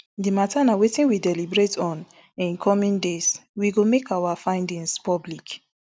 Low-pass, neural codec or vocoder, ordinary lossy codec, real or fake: none; none; none; real